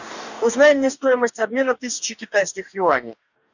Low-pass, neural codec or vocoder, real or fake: 7.2 kHz; codec, 44.1 kHz, 2.6 kbps, DAC; fake